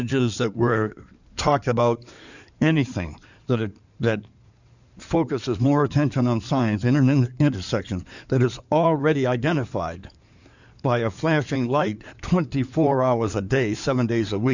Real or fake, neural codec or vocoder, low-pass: fake; codec, 16 kHz in and 24 kHz out, 2.2 kbps, FireRedTTS-2 codec; 7.2 kHz